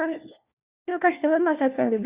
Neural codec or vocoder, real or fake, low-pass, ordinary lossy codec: codec, 16 kHz, 1 kbps, FunCodec, trained on LibriTTS, 50 frames a second; fake; 3.6 kHz; Opus, 64 kbps